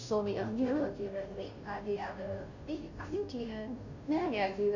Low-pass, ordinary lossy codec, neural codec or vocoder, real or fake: 7.2 kHz; none; codec, 16 kHz, 0.5 kbps, FunCodec, trained on Chinese and English, 25 frames a second; fake